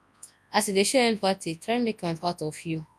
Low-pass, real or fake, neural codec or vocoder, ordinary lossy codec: none; fake; codec, 24 kHz, 0.9 kbps, WavTokenizer, large speech release; none